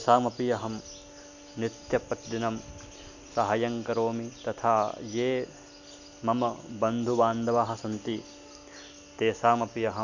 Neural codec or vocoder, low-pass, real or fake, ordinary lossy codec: none; 7.2 kHz; real; none